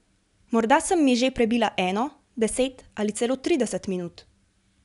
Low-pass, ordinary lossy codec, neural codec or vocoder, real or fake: 10.8 kHz; none; none; real